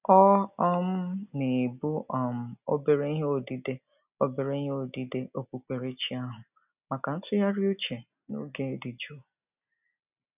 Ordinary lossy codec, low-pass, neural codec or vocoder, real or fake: none; 3.6 kHz; none; real